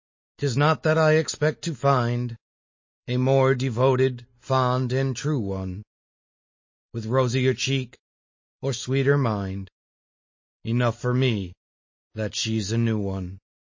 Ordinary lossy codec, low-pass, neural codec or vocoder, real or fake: MP3, 32 kbps; 7.2 kHz; none; real